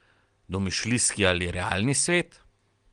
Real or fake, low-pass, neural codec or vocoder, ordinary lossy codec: real; 9.9 kHz; none; Opus, 24 kbps